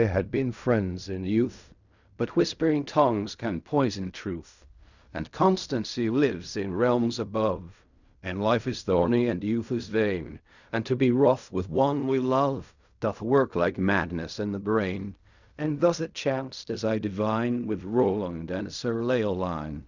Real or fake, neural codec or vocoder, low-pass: fake; codec, 16 kHz in and 24 kHz out, 0.4 kbps, LongCat-Audio-Codec, fine tuned four codebook decoder; 7.2 kHz